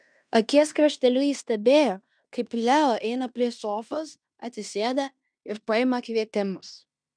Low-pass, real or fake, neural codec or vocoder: 9.9 kHz; fake; codec, 16 kHz in and 24 kHz out, 0.9 kbps, LongCat-Audio-Codec, fine tuned four codebook decoder